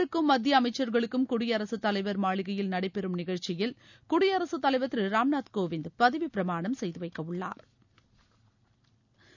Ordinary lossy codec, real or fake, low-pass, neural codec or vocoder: none; real; 7.2 kHz; none